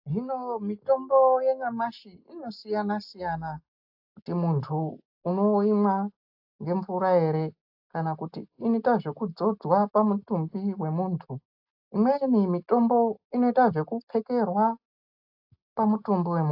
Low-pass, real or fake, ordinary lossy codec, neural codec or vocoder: 5.4 kHz; real; AAC, 48 kbps; none